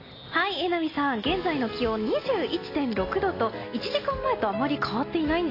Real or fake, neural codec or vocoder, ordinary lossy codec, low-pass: real; none; AAC, 24 kbps; 5.4 kHz